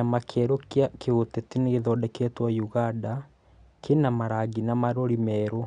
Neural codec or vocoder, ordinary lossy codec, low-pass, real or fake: none; none; 9.9 kHz; real